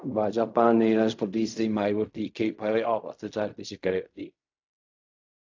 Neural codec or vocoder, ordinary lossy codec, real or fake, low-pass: codec, 16 kHz in and 24 kHz out, 0.4 kbps, LongCat-Audio-Codec, fine tuned four codebook decoder; AAC, 48 kbps; fake; 7.2 kHz